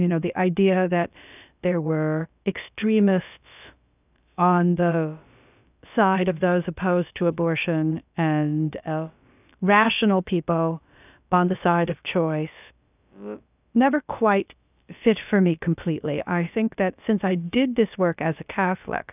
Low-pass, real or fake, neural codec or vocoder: 3.6 kHz; fake; codec, 16 kHz, about 1 kbps, DyCAST, with the encoder's durations